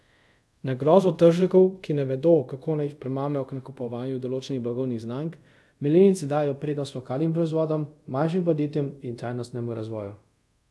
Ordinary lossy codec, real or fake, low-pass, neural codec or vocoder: none; fake; none; codec, 24 kHz, 0.5 kbps, DualCodec